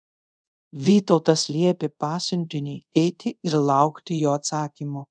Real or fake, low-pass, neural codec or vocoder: fake; 9.9 kHz; codec, 24 kHz, 0.5 kbps, DualCodec